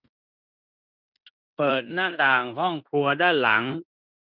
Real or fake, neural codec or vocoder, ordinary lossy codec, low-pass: fake; codec, 16 kHz in and 24 kHz out, 0.9 kbps, LongCat-Audio-Codec, fine tuned four codebook decoder; none; 5.4 kHz